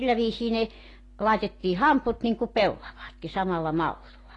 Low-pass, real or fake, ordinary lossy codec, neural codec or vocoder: 10.8 kHz; real; AAC, 32 kbps; none